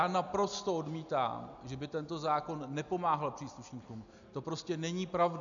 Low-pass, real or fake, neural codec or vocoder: 7.2 kHz; real; none